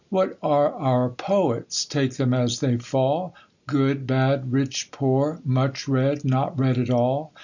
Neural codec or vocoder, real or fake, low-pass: none; real; 7.2 kHz